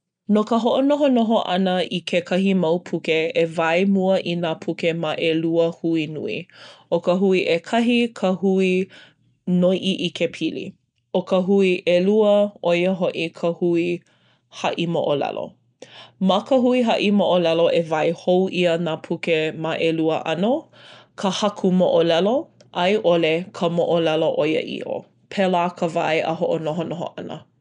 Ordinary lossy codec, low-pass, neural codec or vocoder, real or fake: none; 9.9 kHz; none; real